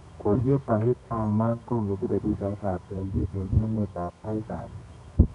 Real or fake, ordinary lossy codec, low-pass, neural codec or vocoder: fake; none; 10.8 kHz; codec, 24 kHz, 0.9 kbps, WavTokenizer, medium music audio release